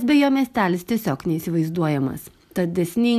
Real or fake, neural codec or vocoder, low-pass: real; none; 14.4 kHz